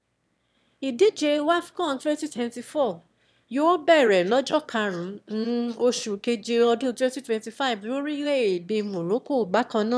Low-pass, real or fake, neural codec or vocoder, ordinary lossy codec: none; fake; autoencoder, 22.05 kHz, a latent of 192 numbers a frame, VITS, trained on one speaker; none